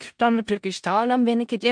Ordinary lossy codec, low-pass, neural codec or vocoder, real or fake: MP3, 64 kbps; 9.9 kHz; codec, 16 kHz in and 24 kHz out, 0.4 kbps, LongCat-Audio-Codec, four codebook decoder; fake